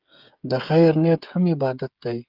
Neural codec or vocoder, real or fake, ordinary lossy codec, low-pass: codec, 16 kHz, 16 kbps, FreqCodec, smaller model; fake; Opus, 32 kbps; 5.4 kHz